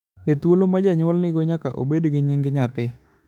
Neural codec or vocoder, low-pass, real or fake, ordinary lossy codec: autoencoder, 48 kHz, 32 numbers a frame, DAC-VAE, trained on Japanese speech; 19.8 kHz; fake; none